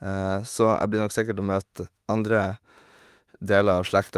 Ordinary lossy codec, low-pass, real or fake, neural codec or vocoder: Opus, 32 kbps; 14.4 kHz; fake; autoencoder, 48 kHz, 32 numbers a frame, DAC-VAE, trained on Japanese speech